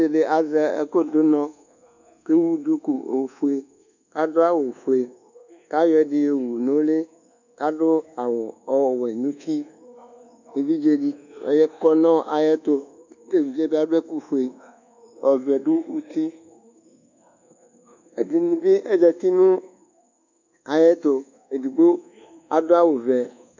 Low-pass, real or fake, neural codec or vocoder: 7.2 kHz; fake; codec, 24 kHz, 1.2 kbps, DualCodec